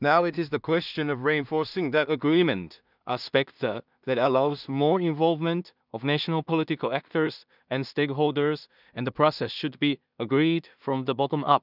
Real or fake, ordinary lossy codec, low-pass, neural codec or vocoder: fake; none; 5.4 kHz; codec, 16 kHz in and 24 kHz out, 0.4 kbps, LongCat-Audio-Codec, two codebook decoder